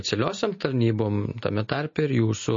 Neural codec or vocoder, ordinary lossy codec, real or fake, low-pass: none; MP3, 32 kbps; real; 7.2 kHz